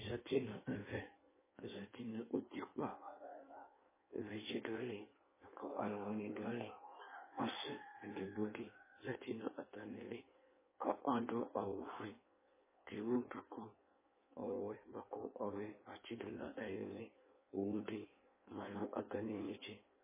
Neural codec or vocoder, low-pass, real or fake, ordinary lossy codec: codec, 16 kHz in and 24 kHz out, 1.1 kbps, FireRedTTS-2 codec; 3.6 kHz; fake; MP3, 16 kbps